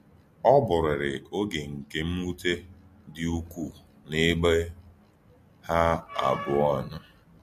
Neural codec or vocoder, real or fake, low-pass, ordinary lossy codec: none; real; 14.4 kHz; MP3, 64 kbps